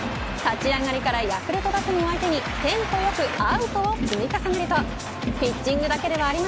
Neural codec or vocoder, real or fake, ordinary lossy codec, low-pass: none; real; none; none